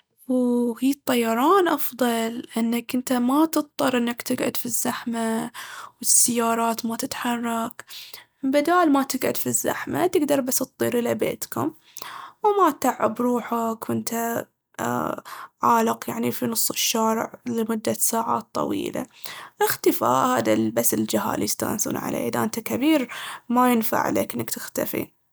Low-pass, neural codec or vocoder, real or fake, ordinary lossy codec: none; none; real; none